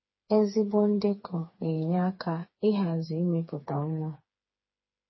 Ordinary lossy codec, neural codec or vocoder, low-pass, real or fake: MP3, 24 kbps; codec, 16 kHz, 4 kbps, FreqCodec, smaller model; 7.2 kHz; fake